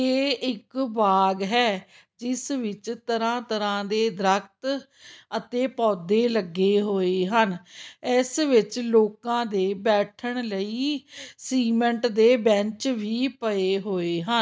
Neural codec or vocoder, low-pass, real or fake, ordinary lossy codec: none; none; real; none